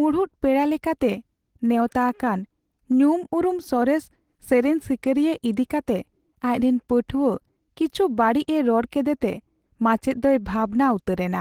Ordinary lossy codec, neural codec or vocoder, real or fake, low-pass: Opus, 16 kbps; none; real; 19.8 kHz